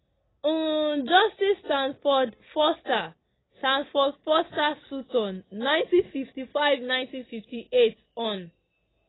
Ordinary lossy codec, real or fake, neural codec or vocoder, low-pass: AAC, 16 kbps; real; none; 7.2 kHz